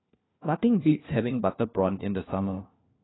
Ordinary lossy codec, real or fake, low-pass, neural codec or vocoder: AAC, 16 kbps; fake; 7.2 kHz; codec, 16 kHz, 1 kbps, FunCodec, trained on LibriTTS, 50 frames a second